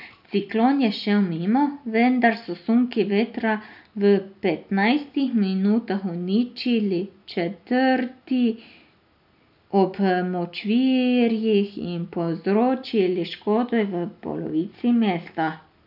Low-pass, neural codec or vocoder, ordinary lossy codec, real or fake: 5.4 kHz; none; none; real